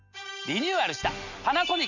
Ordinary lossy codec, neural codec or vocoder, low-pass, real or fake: none; none; 7.2 kHz; real